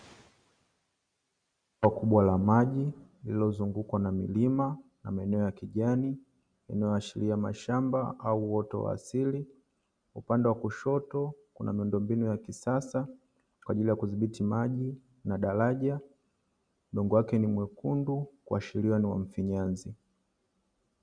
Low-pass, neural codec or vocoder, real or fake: 9.9 kHz; none; real